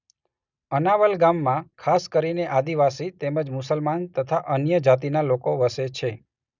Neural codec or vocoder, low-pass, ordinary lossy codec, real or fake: none; 7.2 kHz; none; real